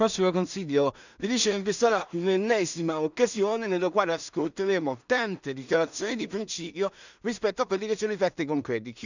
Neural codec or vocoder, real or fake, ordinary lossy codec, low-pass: codec, 16 kHz in and 24 kHz out, 0.4 kbps, LongCat-Audio-Codec, two codebook decoder; fake; none; 7.2 kHz